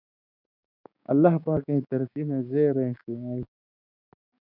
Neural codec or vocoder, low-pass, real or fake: codec, 16 kHz, 6 kbps, DAC; 5.4 kHz; fake